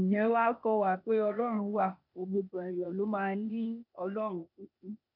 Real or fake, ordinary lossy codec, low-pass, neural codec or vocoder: fake; none; 5.4 kHz; codec, 16 kHz, 0.8 kbps, ZipCodec